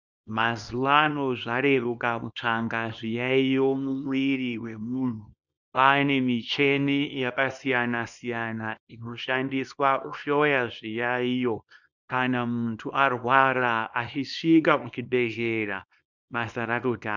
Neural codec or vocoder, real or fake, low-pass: codec, 24 kHz, 0.9 kbps, WavTokenizer, small release; fake; 7.2 kHz